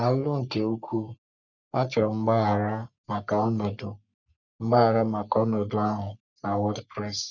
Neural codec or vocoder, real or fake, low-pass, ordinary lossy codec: codec, 44.1 kHz, 3.4 kbps, Pupu-Codec; fake; 7.2 kHz; none